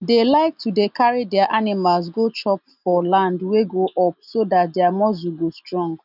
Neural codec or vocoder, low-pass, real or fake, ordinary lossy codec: none; 5.4 kHz; real; none